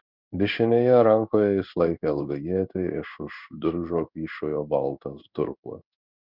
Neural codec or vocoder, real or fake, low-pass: codec, 16 kHz in and 24 kHz out, 1 kbps, XY-Tokenizer; fake; 5.4 kHz